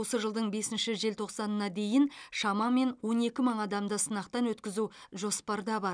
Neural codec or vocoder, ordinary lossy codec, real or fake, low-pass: none; none; real; 9.9 kHz